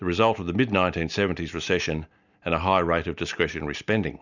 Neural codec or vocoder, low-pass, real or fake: none; 7.2 kHz; real